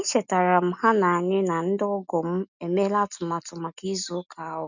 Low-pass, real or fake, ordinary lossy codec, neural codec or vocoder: 7.2 kHz; real; none; none